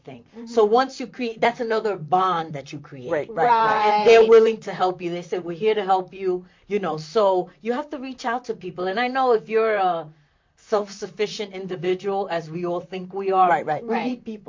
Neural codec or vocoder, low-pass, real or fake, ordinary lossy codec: vocoder, 44.1 kHz, 128 mel bands, Pupu-Vocoder; 7.2 kHz; fake; MP3, 48 kbps